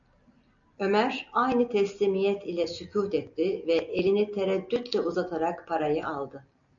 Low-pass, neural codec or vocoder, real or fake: 7.2 kHz; none; real